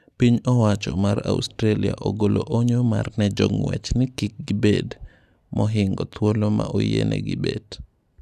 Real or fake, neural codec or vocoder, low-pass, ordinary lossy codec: real; none; 14.4 kHz; none